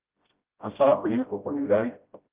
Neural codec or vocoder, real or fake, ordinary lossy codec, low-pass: codec, 16 kHz, 0.5 kbps, FreqCodec, smaller model; fake; Opus, 24 kbps; 3.6 kHz